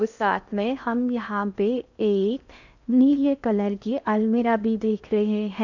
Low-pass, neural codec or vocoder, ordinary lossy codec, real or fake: 7.2 kHz; codec, 16 kHz in and 24 kHz out, 0.6 kbps, FocalCodec, streaming, 2048 codes; none; fake